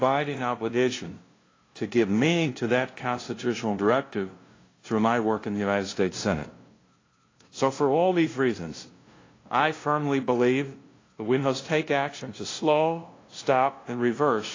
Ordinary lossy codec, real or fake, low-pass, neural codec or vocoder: AAC, 32 kbps; fake; 7.2 kHz; codec, 16 kHz, 0.5 kbps, FunCodec, trained on LibriTTS, 25 frames a second